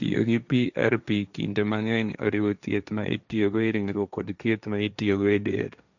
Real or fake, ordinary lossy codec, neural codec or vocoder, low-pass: fake; none; codec, 16 kHz, 1.1 kbps, Voila-Tokenizer; 7.2 kHz